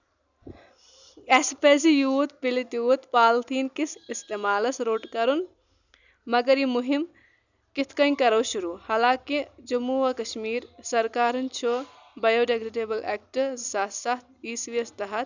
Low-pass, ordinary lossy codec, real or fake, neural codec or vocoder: 7.2 kHz; none; real; none